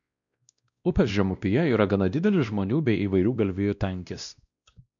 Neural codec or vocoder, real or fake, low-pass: codec, 16 kHz, 1 kbps, X-Codec, WavLM features, trained on Multilingual LibriSpeech; fake; 7.2 kHz